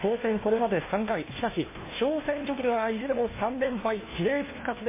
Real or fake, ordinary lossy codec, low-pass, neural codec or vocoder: fake; MP3, 16 kbps; 3.6 kHz; codec, 16 kHz, 1 kbps, FunCodec, trained on LibriTTS, 50 frames a second